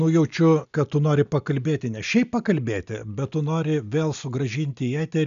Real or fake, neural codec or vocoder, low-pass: real; none; 7.2 kHz